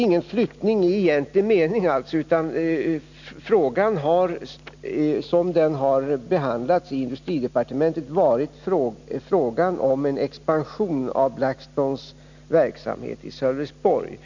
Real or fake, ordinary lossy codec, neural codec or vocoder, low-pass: real; none; none; 7.2 kHz